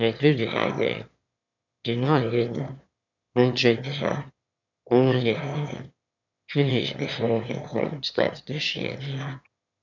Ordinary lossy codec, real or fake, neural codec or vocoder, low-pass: none; fake; autoencoder, 22.05 kHz, a latent of 192 numbers a frame, VITS, trained on one speaker; 7.2 kHz